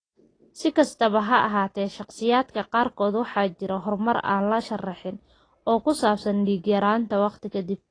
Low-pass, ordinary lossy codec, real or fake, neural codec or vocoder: 9.9 kHz; AAC, 32 kbps; real; none